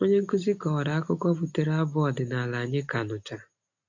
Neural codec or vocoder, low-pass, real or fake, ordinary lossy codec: none; 7.2 kHz; real; AAC, 48 kbps